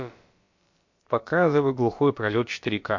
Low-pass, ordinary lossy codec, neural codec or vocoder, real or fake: 7.2 kHz; MP3, 48 kbps; codec, 16 kHz, about 1 kbps, DyCAST, with the encoder's durations; fake